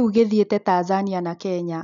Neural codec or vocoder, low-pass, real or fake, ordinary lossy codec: none; 7.2 kHz; real; none